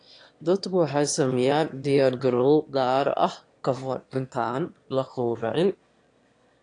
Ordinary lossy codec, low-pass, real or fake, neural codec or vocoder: AAC, 48 kbps; 9.9 kHz; fake; autoencoder, 22.05 kHz, a latent of 192 numbers a frame, VITS, trained on one speaker